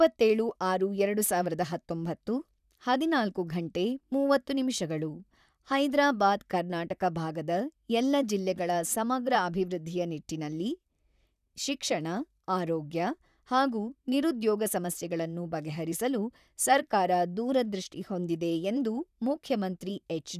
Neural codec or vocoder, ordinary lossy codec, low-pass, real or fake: vocoder, 44.1 kHz, 128 mel bands, Pupu-Vocoder; none; 14.4 kHz; fake